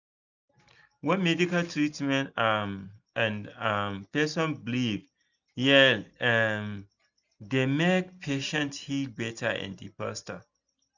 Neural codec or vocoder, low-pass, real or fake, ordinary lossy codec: none; 7.2 kHz; real; none